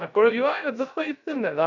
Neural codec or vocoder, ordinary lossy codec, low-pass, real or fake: codec, 16 kHz, 0.3 kbps, FocalCodec; none; 7.2 kHz; fake